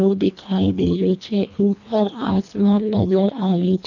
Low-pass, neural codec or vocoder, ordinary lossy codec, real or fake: 7.2 kHz; codec, 24 kHz, 1.5 kbps, HILCodec; none; fake